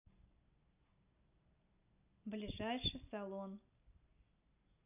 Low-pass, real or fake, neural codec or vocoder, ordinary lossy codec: 3.6 kHz; real; none; none